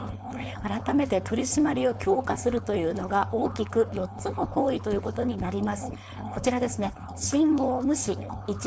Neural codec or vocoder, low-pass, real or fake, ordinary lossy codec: codec, 16 kHz, 4.8 kbps, FACodec; none; fake; none